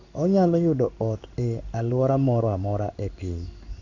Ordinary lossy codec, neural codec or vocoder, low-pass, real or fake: none; codec, 16 kHz in and 24 kHz out, 1 kbps, XY-Tokenizer; 7.2 kHz; fake